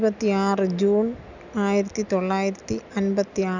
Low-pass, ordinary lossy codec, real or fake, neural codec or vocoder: 7.2 kHz; none; real; none